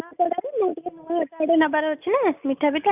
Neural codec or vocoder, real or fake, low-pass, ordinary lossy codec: none; real; 3.6 kHz; none